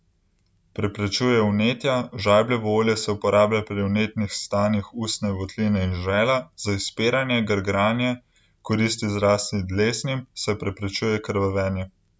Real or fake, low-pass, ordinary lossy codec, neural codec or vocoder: real; none; none; none